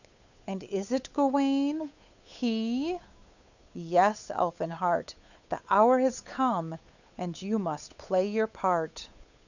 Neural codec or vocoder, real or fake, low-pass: codec, 16 kHz, 8 kbps, FunCodec, trained on Chinese and English, 25 frames a second; fake; 7.2 kHz